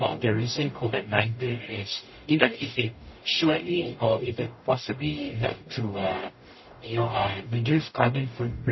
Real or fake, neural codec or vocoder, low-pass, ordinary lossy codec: fake; codec, 44.1 kHz, 0.9 kbps, DAC; 7.2 kHz; MP3, 24 kbps